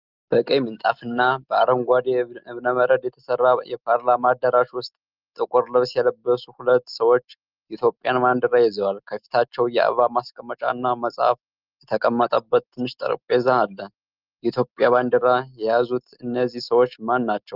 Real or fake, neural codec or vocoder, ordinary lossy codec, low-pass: real; none; Opus, 24 kbps; 5.4 kHz